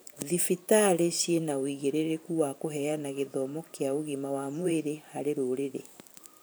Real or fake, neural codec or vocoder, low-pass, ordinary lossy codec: fake; vocoder, 44.1 kHz, 128 mel bands every 512 samples, BigVGAN v2; none; none